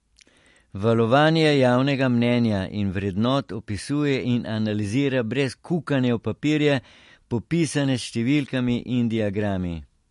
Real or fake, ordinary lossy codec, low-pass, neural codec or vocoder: real; MP3, 48 kbps; 14.4 kHz; none